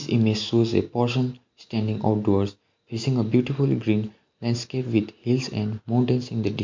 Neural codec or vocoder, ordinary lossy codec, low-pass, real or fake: none; MP3, 48 kbps; 7.2 kHz; real